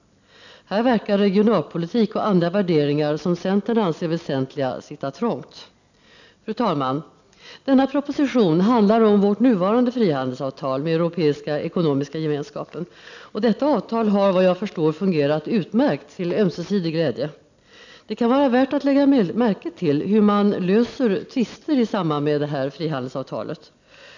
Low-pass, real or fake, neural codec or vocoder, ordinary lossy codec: 7.2 kHz; real; none; none